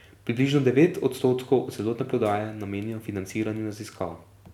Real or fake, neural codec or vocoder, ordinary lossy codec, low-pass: fake; vocoder, 44.1 kHz, 128 mel bands every 256 samples, BigVGAN v2; none; 19.8 kHz